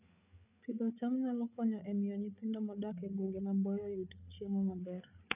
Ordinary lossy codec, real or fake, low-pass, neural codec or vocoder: none; fake; 3.6 kHz; codec, 16 kHz, 8 kbps, FreqCodec, larger model